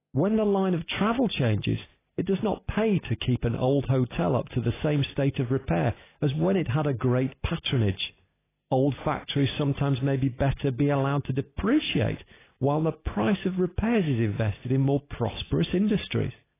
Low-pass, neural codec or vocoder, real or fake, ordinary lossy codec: 3.6 kHz; none; real; AAC, 16 kbps